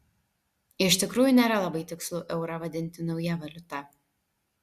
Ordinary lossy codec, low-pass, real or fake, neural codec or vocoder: Opus, 64 kbps; 14.4 kHz; real; none